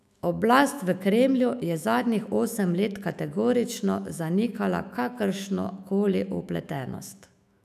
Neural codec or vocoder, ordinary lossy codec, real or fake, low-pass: autoencoder, 48 kHz, 128 numbers a frame, DAC-VAE, trained on Japanese speech; none; fake; 14.4 kHz